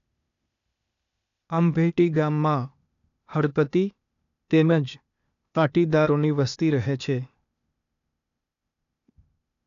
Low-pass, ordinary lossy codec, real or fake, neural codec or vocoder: 7.2 kHz; none; fake; codec, 16 kHz, 0.8 kbps, ZipCodec